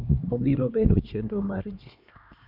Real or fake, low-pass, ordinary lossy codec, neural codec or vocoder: fake; 5.4 kHz; none; codec, 16 kHz, 2 kbps, X-Codec, HuBERT features, trained on LibriSpeech